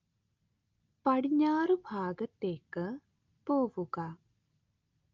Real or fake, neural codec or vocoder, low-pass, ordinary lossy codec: real; none; 7.2 kHz; Opus, 24 kbps